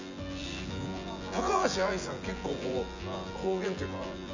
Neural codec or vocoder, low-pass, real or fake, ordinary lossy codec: vocoder, 24 kHz, 100 mel bands, Vocos; 7.2 kHz; fake; none